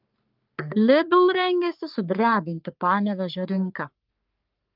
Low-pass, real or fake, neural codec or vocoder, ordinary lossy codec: 5.4 kHz; fake; codec, 44.1 kHz, 3.4 kbps, Pupu-Codec; Opus, 24 kbps